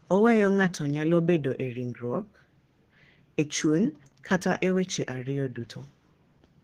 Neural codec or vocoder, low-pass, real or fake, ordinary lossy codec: codec, 32 kHz, 1.9 kbps, SNAC; 14.4 kHz; fake; Opus, 16 kbps